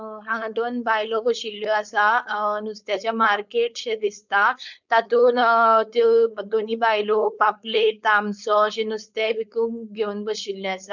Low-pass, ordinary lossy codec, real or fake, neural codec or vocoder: 7.2 kHz; none; fake; codec, 16 kHz, 4.8 kbps, FACodec